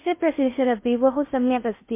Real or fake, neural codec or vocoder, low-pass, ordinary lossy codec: fake; codec, 16 kHz in and 24 kHz out, 0.6 kbps, FocalCodec, streaming, 4096 codes; 3.6 kHz; MP3, 24 kbps